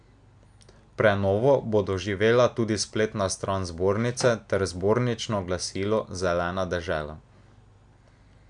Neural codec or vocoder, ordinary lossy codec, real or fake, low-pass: none; MP3, 96 kbps; real; 9.9 kHz